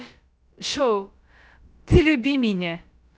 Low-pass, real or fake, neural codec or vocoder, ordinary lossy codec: none; fake; codec, 16 kHz, about 1 kbps, DyCAST, with the encoder's durations; none